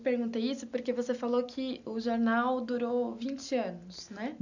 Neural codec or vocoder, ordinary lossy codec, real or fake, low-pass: none; none; real; 7.2 kHz